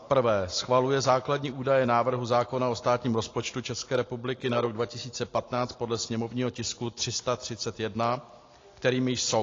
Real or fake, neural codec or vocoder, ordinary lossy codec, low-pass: real; none; AAC, 32 kbps; 7.2 kHz